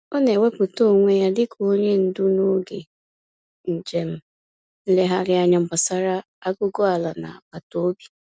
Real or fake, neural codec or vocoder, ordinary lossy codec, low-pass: real; none; none; none